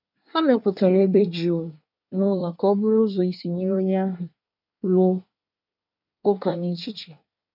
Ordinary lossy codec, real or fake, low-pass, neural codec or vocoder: none; fake; 5.4 kHz; codec, 24 kHz, 1 kbps, SNAC